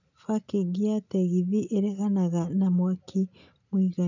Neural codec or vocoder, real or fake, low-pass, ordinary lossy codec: none; real; 7.2 kHz; none